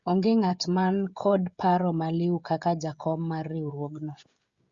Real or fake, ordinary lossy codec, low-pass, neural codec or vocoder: fake; Opus, 64 kbps; 7.2 kHz; codec, 16 kHz, 16 kbps, FreqCodec, smaller model